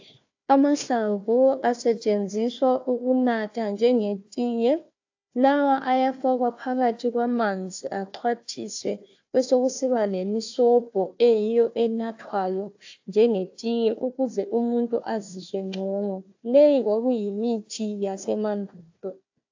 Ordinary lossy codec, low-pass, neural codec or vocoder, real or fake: AAC, 48 kbps; 7.2 kHz; codec, 16 kHz, 1 kbps, FunCodec, trained on Chinese and English, 50 frames a second; fake